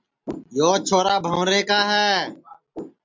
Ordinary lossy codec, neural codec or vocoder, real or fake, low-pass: MP3, 64 kbps; none; real; 7.2 kHz